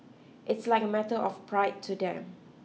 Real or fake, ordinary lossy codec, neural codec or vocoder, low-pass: real; none; none; none